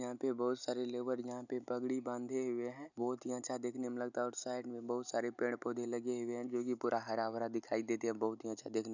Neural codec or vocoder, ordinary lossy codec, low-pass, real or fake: none; none; 7.2 kHz; real